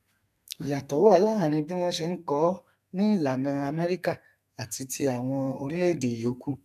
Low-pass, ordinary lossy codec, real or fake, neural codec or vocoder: 14.4 kHz; none; fake; codec, 32 kHz, 1.9 kbps, SNAC